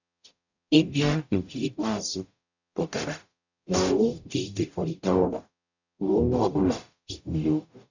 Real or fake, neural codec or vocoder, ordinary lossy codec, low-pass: fake; codec, 44.1 kHz, 0.9 kbps, DAC; none; 7.2 kHz